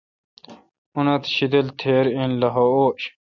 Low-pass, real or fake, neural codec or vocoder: 7.2 kHz; real; none